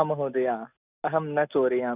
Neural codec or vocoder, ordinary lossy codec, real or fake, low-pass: none; none; real; 3.6 kHz